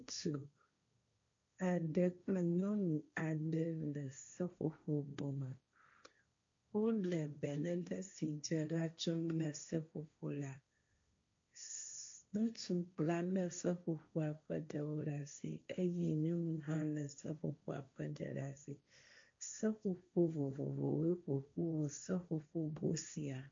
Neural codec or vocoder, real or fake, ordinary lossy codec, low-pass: codec, 16 kHz, 1.1 kbps, Voila-Tokenizer; fake; MP3, 48 kbps; 7.2 kHz